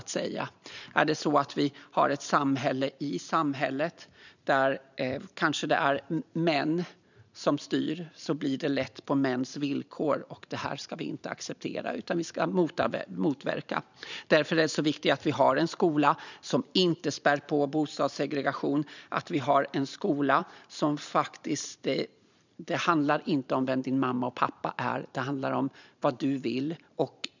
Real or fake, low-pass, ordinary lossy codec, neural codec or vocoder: fake; 7.2 kHz; none; vocoder, 44.1 kHz, 128 mel bands every 512 samples, BigVGAN v2